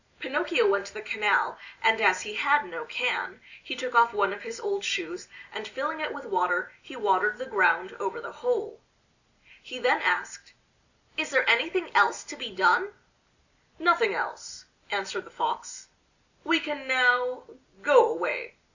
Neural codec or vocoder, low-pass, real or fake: none; 7.2 kHz; real